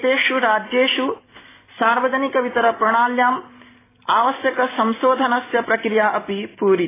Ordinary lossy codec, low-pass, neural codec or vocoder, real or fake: AAC, 16 kbps; 3.6 kHz; none; real